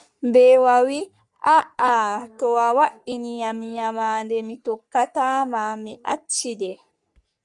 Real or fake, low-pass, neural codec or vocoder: fake; 10.8 kHz; codec, 44.1 kHz, 3.4 kbps, Pupu-Codec